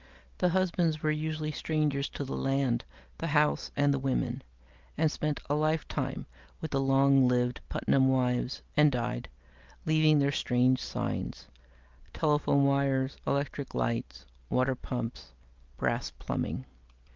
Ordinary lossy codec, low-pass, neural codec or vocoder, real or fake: Opus, 32 kbps; 7.2 kHz; none; real